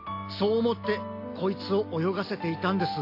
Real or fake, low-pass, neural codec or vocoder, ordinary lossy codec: real; 5.4 kHz; none; AAC, 32 kbps